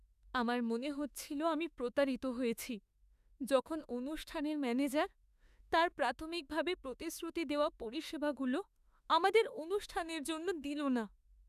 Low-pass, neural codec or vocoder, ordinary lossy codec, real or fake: 14.4 kHz; autoencoder, 48 kHz, 32 numbers a frame, DAC-VAE, trained on Japanese speech; none; fake